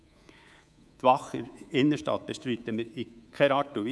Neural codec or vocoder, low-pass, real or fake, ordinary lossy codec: codec, 24 kHz, 3.1 kbps, DualCodec; none; fake; none